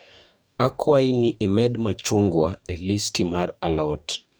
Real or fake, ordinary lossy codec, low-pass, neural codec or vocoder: fake; none; none; codec, 44.1 kHz, 2.6 kbps, DAC